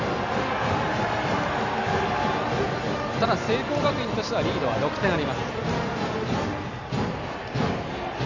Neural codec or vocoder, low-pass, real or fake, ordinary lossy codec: none; 7.2 kHz; real; none